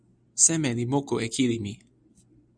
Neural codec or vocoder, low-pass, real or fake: none; 9.9 kHz; real